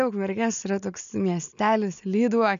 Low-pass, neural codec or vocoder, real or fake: 7.2 kHz; none; real